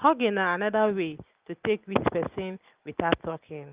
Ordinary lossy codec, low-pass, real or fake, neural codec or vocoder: Opus, 24 kbps; 3.6 kHz; real; none